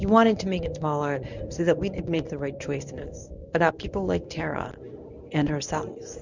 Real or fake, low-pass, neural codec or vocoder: fake; 7.2 kHz; codec, 24 kHz, 0.9 kbps, WavTokenizer, medium speech release version 1